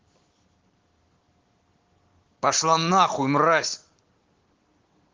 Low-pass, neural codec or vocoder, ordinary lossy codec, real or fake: 7.2 kHz; none; Opus, 16 kbps; real